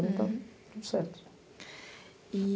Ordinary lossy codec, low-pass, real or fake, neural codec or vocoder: none; none; real; none